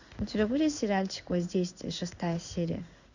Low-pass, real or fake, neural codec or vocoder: 7.2 kHz; fake; codec, 16 kHz in and 24 kHz out, 1 kbps, XY-Tokenizer